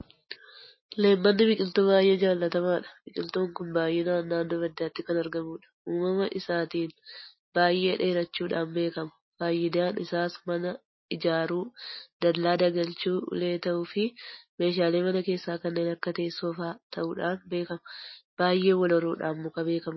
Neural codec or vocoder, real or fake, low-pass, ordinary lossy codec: none; real; 7.2 kHz; MP3, 24 kbps